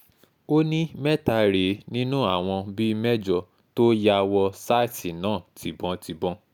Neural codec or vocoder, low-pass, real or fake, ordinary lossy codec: none; 19.8 kHz; real; none